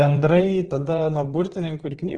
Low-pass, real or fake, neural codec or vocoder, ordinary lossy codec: 9.9 kHz; fake; vocoder, 22.05 kHz, 80 mel bands, WaveNeXt; Opus, 16 kbps